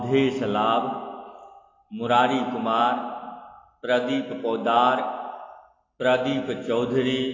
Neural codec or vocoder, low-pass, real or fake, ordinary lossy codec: none; 7.2 kHz; real; AAC, 32 kbps